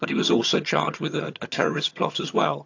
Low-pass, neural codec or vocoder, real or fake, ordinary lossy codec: 7.2 kHz; vocoder, 22.05 kHz, 80 mel bands, HiFi-GAN; fake; AAC, 48 kbps